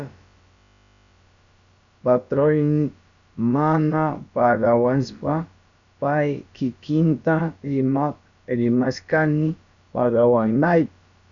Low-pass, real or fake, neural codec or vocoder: 7.2 kHz; fake; codec, 16 kHz, about 1 kbps, DyCAST, with the encoder's durations